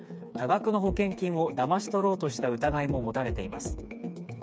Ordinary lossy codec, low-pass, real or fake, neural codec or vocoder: none; none; fake; codec, 16 kHz, 4 kbps, FreqCodec, smaller model